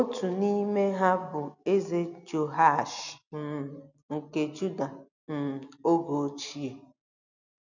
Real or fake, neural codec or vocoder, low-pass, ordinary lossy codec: real; none; 7.2 kHz; none